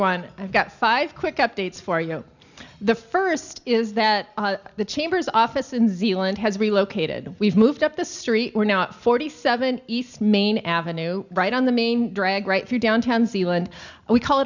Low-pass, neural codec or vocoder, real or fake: 7.2 kHz; none; real